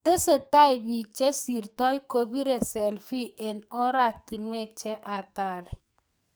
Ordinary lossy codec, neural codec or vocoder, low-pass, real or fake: none; codec, 44.1 kHz, 2.6 kbps, SNAC; none; fake